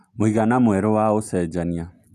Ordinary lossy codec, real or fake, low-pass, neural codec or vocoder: none; real; 14.4 kHz; none